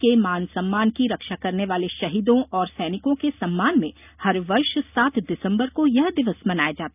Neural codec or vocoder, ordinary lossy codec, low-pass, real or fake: none; none; 3.6 kHz; real